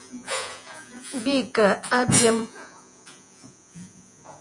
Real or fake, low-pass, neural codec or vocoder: fake; 10.8 kHz; vocoder, 48 kHz, 128 mel bands, Vocos